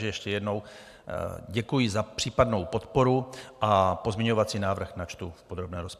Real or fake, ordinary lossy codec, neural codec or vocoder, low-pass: real; AAC, 96 kbps; none; 14.4 kHz